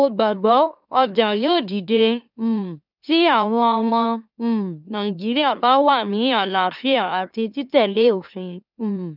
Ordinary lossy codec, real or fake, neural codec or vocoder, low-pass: none; fake; autoencoder, 44.1 kHz, a latent of 192 numbers a frame, MeloTTS; 5.4 kHz